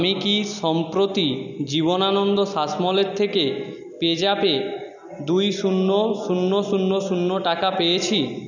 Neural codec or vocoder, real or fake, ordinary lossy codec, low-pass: none; real; none; 7.2 kHz